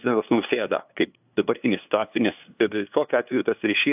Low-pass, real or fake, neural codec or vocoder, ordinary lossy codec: 3.6 kHz; fake; codec, 16 kHz, 2 kbps, FunCodec, trained on LibriTTS, 25 frames a second; AAC, 32 kbps